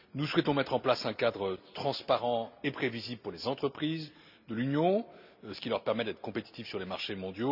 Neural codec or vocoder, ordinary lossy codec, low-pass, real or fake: none; none; 5.4 kHz; real